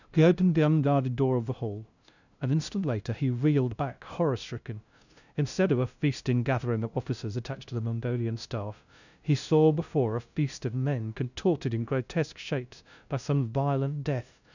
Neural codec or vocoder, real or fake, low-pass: codec, 16 kHz, 0.5 kbps, FunCodec, trained on LibriTTS, 25 frames a second; fake; 7.2 kHz